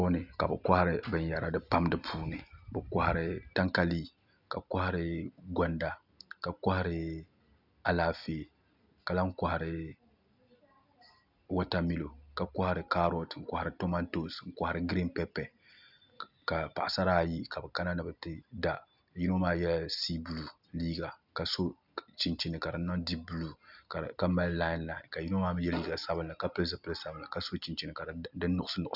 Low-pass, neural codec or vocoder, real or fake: 5.4 kHz; none; real